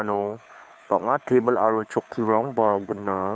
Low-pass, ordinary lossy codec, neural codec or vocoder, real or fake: none; none; codec, 16 kHz, 2 kbps, FunCodec, trained on Chinese and English, 25 frames a second; fake